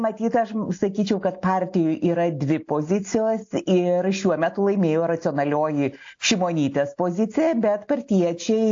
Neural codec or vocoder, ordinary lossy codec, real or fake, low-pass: none; AAC, 48 kbps; real; 7.2 kHz